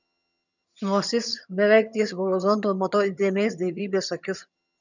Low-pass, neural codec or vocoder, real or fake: 7.2 kHz; vocoder, 22.05 kHz, 80 mel bands, HiFi-GAN; fake